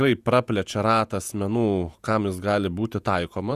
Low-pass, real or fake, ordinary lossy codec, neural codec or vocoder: 14.4 kHz; fake; Opus, 64 kbps; vocoder, 48 kHz, 128 mel bands, Vocos